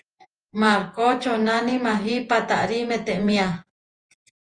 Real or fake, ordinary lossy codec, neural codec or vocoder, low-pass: fake; Opus, 24 kbps; vocoder, 48 kHz, 128 mel bands, Vocos; 9.9 kHz